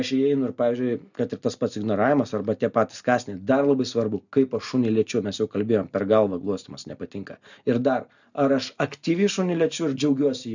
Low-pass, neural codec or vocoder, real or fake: 7.2 kHz; none; real